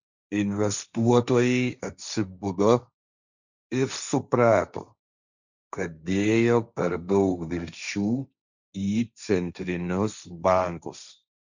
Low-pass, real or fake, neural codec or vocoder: 7.2 kHz; fake; codec, 16 kHz, 1.1 kbps, Voila-Tokenizer